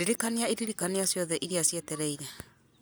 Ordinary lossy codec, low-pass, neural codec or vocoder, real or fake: none; none; none; real